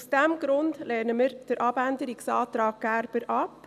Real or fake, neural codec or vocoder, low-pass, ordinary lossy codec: real; none; 14.4 kHz; none